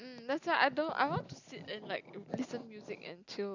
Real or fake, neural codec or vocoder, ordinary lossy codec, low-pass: real; none; none; 7.2 kHz